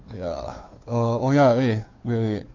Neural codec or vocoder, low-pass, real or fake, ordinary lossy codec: codec, 16 kHz, 2 kbps, FunCodec, trained on Chinese and English, 25 frames a second; 7.2 kHz; fake; AAC, 48 kbps